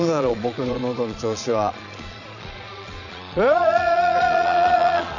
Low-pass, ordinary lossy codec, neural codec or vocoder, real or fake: 7.2 kHz; none; vocoder, 22.05 kHz, 80 mel bands, Vocos; fake